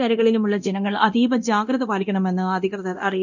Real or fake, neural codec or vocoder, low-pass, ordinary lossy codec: fake; codec, 24 kHz, 0.9 kbps, DualCodec; 7.2 kHz; none